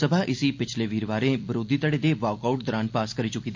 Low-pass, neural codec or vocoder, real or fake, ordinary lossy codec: 7.2 kHz; none; real; MP3, 48 kbps